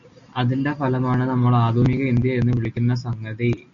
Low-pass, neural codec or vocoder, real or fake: 7.2 kHz; none; real